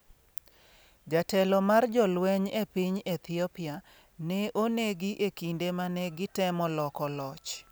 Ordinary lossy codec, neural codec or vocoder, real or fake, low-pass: none; none; real; none